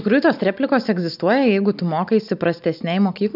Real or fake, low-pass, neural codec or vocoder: real; 5.4 kHz; none